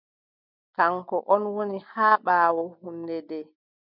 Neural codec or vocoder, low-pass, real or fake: none; 5.4 kHz; real